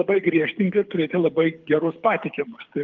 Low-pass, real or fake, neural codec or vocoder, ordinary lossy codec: 7.2 kHz; fake; codec, 24 kHz, 6 kbps, HILCodec; Opus, 32 kbps